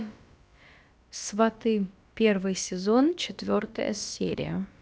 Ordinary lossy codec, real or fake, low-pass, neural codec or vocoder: none; fake; none; codec, 16 kHz, about 1 kbps, DyCAST, with the encoder's durations